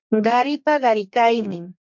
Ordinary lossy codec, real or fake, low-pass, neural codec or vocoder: MP3, 48 kbps; fake; 7.2 kHz; codec, 32 kHz, 1.9 kbps, SNAC